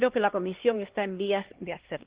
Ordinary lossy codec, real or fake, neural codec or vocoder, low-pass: Opus, 16 kbps; fake; codec, 16 kHz, 2 kbps, X-Codec, HuBERT features, trained on LibriSpeech; 3.6 kHz